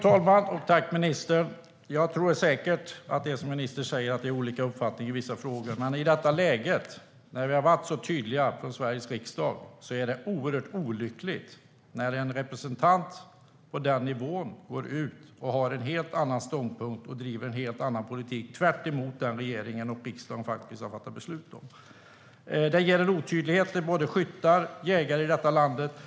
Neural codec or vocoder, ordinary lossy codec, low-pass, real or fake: none; none; none; real